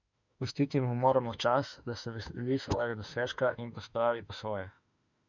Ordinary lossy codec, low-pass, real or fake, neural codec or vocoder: none; 7.2 kHz; fake; autoencoder, 48 kHz, 32 numbers a frame, DAC-VAE, trained on Japanese speech